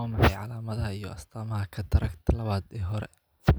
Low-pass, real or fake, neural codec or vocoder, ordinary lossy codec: none; real; none; none